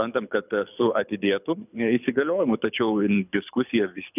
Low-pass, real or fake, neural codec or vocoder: 3.6 kHz; fake; codec, 24 kHz, 6 kbps, HILCodec